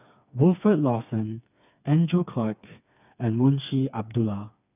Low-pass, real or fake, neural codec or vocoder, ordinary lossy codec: 3.6 kHz; fake; codec, 16 kHz, 4 kbps, FreqCodec, smaller model; none